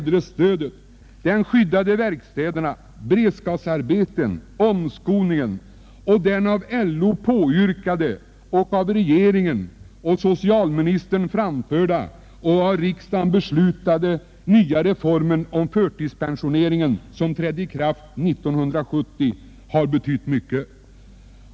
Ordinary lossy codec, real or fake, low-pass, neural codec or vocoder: none; real; none; none